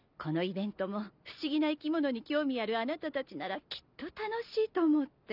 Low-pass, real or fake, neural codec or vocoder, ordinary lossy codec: 5.4 kHz; real; none; none